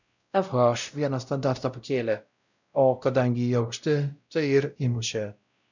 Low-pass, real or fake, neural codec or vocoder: 7.2 kHz; fake; codec, 16 kHz, 0.5 kbps, X-Codec, WavLM features, trained on Multilingual LibriSpeech